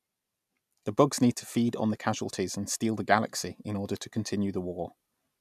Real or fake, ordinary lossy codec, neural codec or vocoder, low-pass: real; none; none; 14.4 kHz